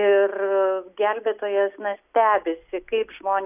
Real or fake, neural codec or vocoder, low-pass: real; none; 3.6 kHz